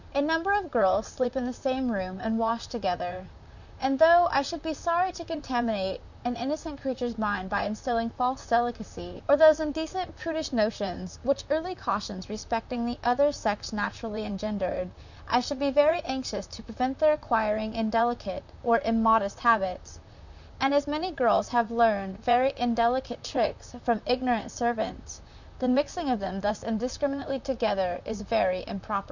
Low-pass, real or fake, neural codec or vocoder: 7.2 kHz; fake; vocoder, 44.1 kHz, 128 mel bands, Pupu-Vocoder